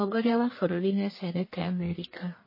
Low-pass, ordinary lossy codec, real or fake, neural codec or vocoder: 5.4 kHz; MP3, 24 kbps; fake; codec, 44.1 kHz, 1.7 kbps, Pupu-Codec